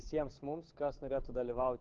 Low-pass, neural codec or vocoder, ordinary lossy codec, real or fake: 7.2 kHz; codec, 16 kHz, 8 kbps, FunCodec, trained on Chinese and English, 25 frames a second; Opus, 16 kbps; fake